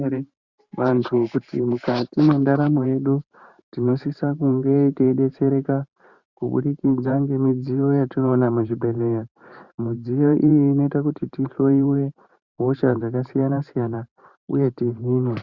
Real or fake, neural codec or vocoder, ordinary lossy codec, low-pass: fake; vocoder, 44.1 kHz, 128 mel bands every 512 samples, BigVGAN v2; Opus, 64 kbps; 7.2 kHz